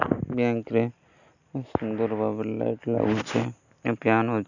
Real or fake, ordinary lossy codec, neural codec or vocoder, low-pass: real; none; none; 7.2 kHz